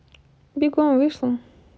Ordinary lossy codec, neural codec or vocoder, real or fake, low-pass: none; none; real; none